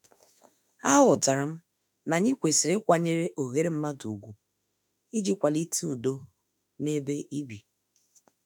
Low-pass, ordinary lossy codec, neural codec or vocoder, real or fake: none; none; autoencoder, 48 kHz, 32 numbers a frame, DAC-VAE, trained on Japanese speech; fake